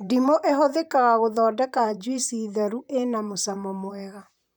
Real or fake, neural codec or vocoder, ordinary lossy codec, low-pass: fake; vocoder, 44.1 kHz, 128 mel bands, Pupu-Vocoder; none; none